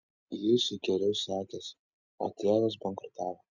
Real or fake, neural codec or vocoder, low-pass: real; none; 7.2 kHz